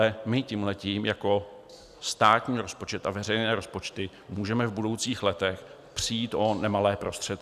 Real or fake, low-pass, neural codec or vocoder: real; 14.4 kHz; none